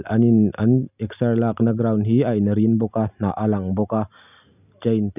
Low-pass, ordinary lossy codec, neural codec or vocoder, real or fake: 3.6 kHz; none; none; real